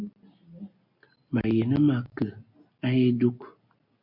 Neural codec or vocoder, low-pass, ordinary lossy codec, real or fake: none; 5.4 kHz; MP3, 48 kbps; real